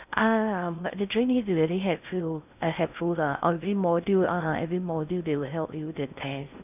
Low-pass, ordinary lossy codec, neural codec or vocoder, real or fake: 3.6 kHz; none; codec, 16 kHz in and 24 kHz out, 0.6 kbps, FocalCodec, streaming, 4096 codes; fake